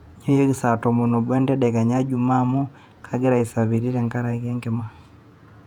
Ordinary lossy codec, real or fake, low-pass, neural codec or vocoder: none; fake; 19.8 kHz; vocoder, 48 kHz, 128 mel bands, Vocos